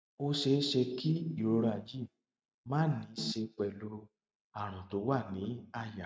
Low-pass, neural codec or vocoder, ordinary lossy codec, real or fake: none; none; none; real